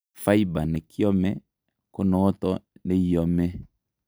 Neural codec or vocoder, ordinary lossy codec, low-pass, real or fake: none; none; none; real